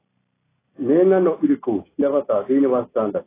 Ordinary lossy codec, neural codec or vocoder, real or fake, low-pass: AAC, 16 kbps; none; real; 3.6 kHz